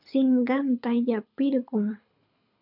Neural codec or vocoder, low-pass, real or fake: codec, 24 kHz, 6 kbps, HILCodec; 5.4 kHz; fake